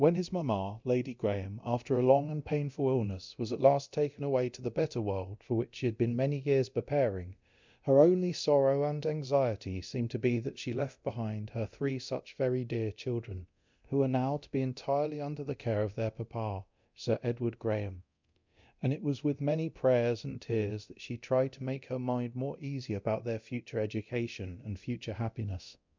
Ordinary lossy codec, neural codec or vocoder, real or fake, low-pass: MP3, 64 kbps; codec, 24 kHz, 0.9 kbps, DualCodec; fake; 7.2 kHz